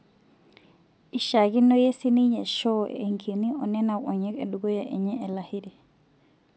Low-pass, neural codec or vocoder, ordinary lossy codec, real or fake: none; none; none; real